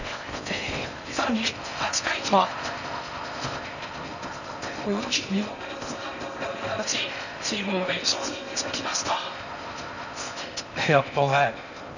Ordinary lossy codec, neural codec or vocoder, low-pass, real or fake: none; codec, 16 kHz in and 24 kHz out, 0.6 kbps, FocalCodec, streaming, 4096 codes; 7.2 kHz; fake